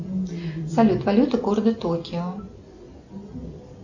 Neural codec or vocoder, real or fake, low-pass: none; real; 7.2 kHz